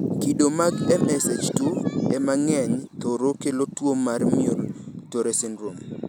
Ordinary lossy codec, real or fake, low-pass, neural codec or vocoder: none; real; none; none